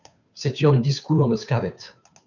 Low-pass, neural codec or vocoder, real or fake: 7.2 kHz; codec, 16 kHz, 2 kbps, FunCodec, trained on Chinese and English, 25 frames a second; fake